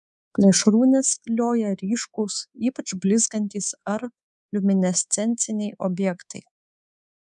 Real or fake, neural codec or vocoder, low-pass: fake; codec, 24 kHz, 3.1 kbps, DualCodec; 10.8 kHz